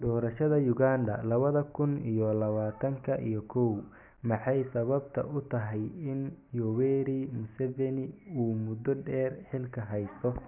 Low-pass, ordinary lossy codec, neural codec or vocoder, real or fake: 3.6 kHz; none; none; real